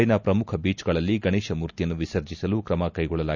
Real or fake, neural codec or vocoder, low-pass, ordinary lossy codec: real; none; 7.2 kHz; none